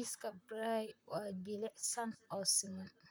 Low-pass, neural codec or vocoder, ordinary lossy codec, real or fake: none; vocoder, 44.1 kHz, 128 mel bands, Pupu-Vocoder; none; fake